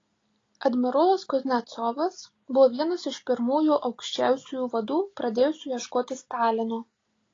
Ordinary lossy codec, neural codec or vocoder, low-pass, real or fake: AAC, 32 kbps; none; 7.2 kHz; real